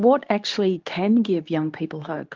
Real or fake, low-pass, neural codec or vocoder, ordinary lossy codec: fake; 7.2 kHz; codec, 24 kHz, 0.9 kbps, WavTokenizer, medium speech release version 2; Opus, 32 kbps